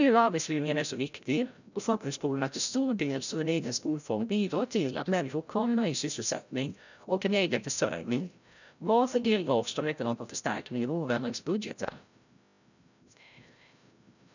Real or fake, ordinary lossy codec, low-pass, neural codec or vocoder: fake; none; 7.2 kHz; codec, 16 kHz, 0.5 kbps, FreqCodec, larger model